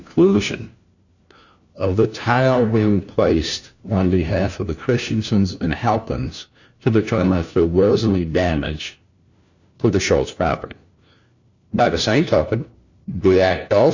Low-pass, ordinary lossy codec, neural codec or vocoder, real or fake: 7.2 kHz; Opus, 64 kbps; codec, 16 kHz, 1 kbps, FunCodec, trained on LibriTTS, 50 frames a second; fake